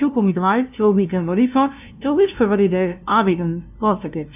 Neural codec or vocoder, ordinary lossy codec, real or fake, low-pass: codec, 16 kHz, 0.5 kbps, FunCodec, trained on LibriTTS, 25 frames a second; none; fake; 3.6 kHz